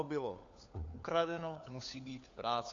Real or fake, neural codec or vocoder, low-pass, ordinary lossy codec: fake; codec, 16 kHz, 4 kbps, FunCodec, trained on LibriTTS, 50 frames a second; 7.2 kHz; AAC, 48 kbps